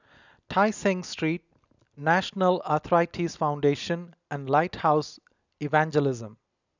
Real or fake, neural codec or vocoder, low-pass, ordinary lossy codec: real; none; 7.2 kHz; none